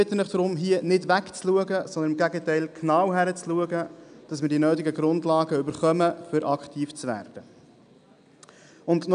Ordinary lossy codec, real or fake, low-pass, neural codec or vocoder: none; real; 9.9 kHz; none